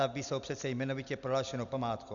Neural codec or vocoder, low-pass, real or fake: none; 7.2 kHz; real